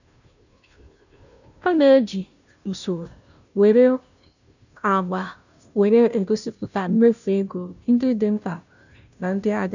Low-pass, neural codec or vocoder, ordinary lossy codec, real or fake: 7.2 kHz; codec, 16 kHz, 0.5 kbps, FunCodec, trained on Chinese and English, 25 frames a second; none; fake